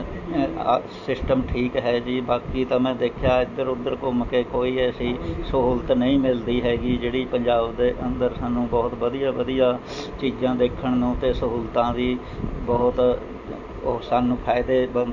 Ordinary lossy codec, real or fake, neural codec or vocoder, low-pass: MP3, 48 kbps; real; none; 7.2 kHz